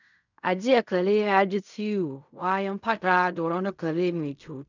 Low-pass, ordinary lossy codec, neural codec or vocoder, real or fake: 7.2 kHz; none; codec, 16 kHz in and 24 kHz out, 0.4 kbps, LongCat-Audio-Codec, fine tuned four codebook decoder; fake